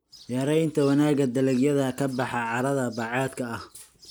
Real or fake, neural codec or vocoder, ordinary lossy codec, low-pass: real; none; none; none